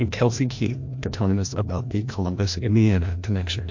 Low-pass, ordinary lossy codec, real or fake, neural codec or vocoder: 7.2 kHz; MP3, 48 kbps; fake; codec, 16 kHz, 1 kbps, FreqCodec, larger model